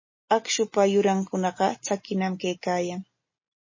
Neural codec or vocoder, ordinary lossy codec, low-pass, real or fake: none; MP3, 32 kbps; 7.2 kHz; real